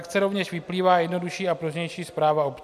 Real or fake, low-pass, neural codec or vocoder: real; 14.4 kHz; none